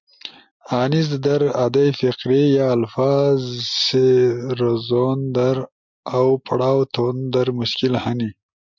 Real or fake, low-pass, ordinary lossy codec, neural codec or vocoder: real; 7.2 kHz; MP3, 64 kbps; none